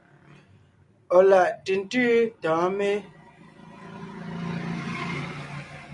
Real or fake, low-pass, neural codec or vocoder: real; 10.8 kHz; none